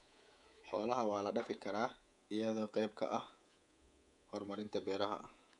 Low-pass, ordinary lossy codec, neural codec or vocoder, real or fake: 10.8 kHz; none; codec, 24 kHz, 3.1 kbps, DualCodec; fake